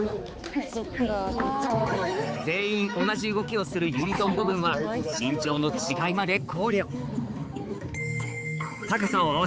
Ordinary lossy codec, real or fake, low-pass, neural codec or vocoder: none; fake; none; codec, 16 kHz, 4 kbps, X-Codec, HuBERT features, trained on balanced general audio